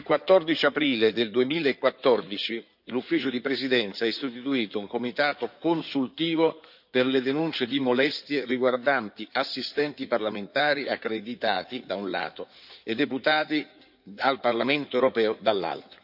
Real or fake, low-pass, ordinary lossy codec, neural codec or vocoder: fake; 5.4 kHz; none; codec, 16 kHz in and 24 kHz out, 2.2 kbps, FireRedTTS-2 codec